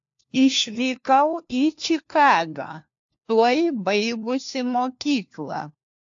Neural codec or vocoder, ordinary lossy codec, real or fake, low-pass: codec, 16 kHz, 1 kbps, FunCodec, trained on LibriTTS, 50 frames a second; AAC, 64 kbps; fake; 7.2 kHz